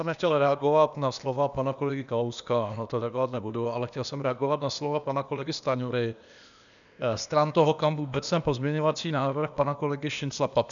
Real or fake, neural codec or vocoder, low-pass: fake; codec, 16 kHz, 0.8 kbps, ZipCodec; 7.2 kHz